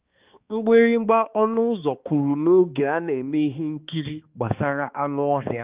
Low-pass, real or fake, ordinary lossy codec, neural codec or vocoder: 3.6 kHz; fake; Opus, 32 kbps; codec, 16 kHz, 2 kbps, X-Codec, HuBERT features, trained on balanced general audio